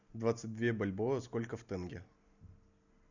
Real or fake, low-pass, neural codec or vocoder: real; 7.2 kHz; none